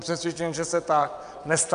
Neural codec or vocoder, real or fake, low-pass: vocoder, 22.05 kHz, 80 mel bands, WaveNeXt; fake; 9.9 kHz